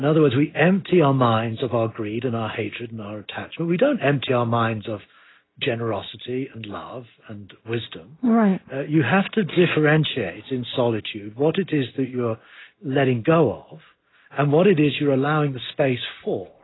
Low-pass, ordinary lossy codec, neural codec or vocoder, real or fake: 7.2 kHz; AAC, 16 kbps; none; real